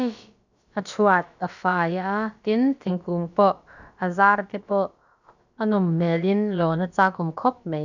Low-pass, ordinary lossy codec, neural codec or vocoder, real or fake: 7.2 kHz; none; codec, 16 kHz, about 1 kbps, DyCAST, with the encoder's durations; fake